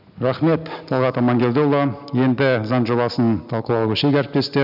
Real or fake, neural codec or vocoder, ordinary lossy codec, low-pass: real; none; none; 5.4 kHz